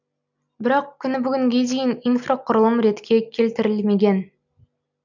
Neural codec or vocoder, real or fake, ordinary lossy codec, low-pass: none; real; none; 7.2 kHz